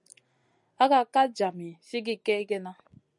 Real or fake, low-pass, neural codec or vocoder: real; 10.8 kHz; none